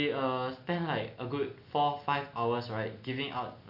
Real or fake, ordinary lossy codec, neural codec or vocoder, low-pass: real; none; none; 5.4 kHz